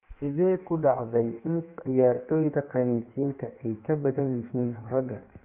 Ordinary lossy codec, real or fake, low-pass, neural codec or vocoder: none; fake; 3.6 kHz; codec, 16 kHz in and 24 kHz out, 1.1 kbps, FireRedTTS-2 codec